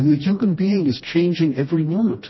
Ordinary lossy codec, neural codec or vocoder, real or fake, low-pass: MP3, 24 kbps; codec, 16 kHz, 1 kbps, FreqCodec, smaller model; fake; 7.2 kHz